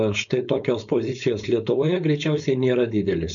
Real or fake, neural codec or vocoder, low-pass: fake; codec, 16 kHz, 4.8 kbps, FACodec; 7.2 kHz